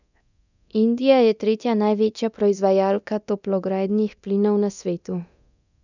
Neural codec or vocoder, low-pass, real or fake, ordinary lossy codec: codec, 24 kHz, 0.9 kbps, DualCodec; 7.2 kHz; fake; none